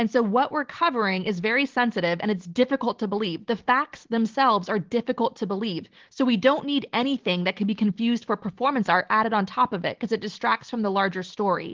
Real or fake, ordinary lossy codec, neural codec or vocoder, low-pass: real; Opus, 16 kbps; none; 7.2 kHz